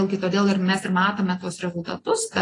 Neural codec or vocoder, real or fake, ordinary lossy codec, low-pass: none; real; AAC, 32 kbps; 10.8 kHz